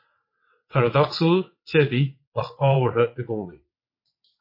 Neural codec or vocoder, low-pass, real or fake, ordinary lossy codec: vocoder, 22.05 kHz, 80 mel bands, WaveNeXt; 5.4 kHz; fake; MP3, 32 kbps